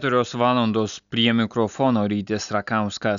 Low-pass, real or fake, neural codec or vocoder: 7.2 kHz; real; none